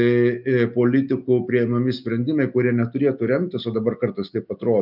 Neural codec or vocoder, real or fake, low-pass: none; real; 5.4 kHz